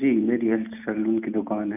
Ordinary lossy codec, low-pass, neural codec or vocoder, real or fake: none; 3.6 kHz; none; real